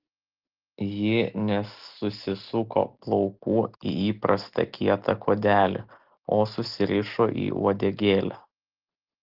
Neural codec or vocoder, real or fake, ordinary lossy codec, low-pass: none; real; Opus, 16 kbps; 5.4 kHz